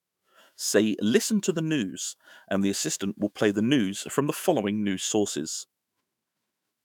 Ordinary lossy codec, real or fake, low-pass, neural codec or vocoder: none; fake; 19.8 kHz; autoencoder, 48 kHz, 128 numbers a frame, DAC-VAE, trained on Japanese speech